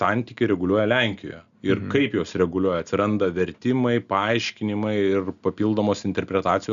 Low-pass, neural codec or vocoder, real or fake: 7.2 kHz; none; real